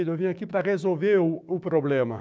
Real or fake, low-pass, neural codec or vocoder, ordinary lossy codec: fake; none; codec, 16 kHz, 6 kbps, DAC; none